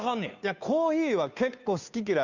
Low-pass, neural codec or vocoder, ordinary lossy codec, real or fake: 7.2 kHz; codec, 16 kHz, 2 kbps, FunCodec, trained on Chinese and English, 25 frames a second; none; fake